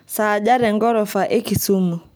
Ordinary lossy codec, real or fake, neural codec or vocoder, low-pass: none; real; none; none